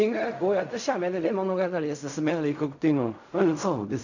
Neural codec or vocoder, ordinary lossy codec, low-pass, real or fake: codec, 16 kHz in and 24 kHz out, 0.4 kbps, LongCat-Audio-Codec, fine tuned four codebook decoder; none; 7.2 kHz; fake